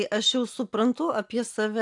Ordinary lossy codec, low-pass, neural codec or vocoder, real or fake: AAC, 64 kbps; 10.8 kHz; none; real